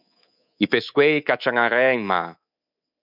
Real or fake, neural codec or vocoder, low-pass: fake; codec, 24 kHz, 3.1 kbps, DualCodec; 5.4 kHz